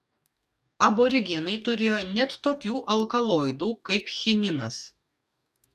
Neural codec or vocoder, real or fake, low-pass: codec, 44.1 kHz, 2.6 kbps, DAC; fake; 14.4 kHz